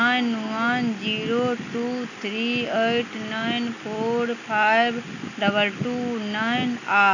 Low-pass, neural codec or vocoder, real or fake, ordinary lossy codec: 7.2 kHz; none; real; none